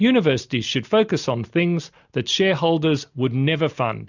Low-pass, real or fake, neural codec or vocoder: 7.2 kHz; real; none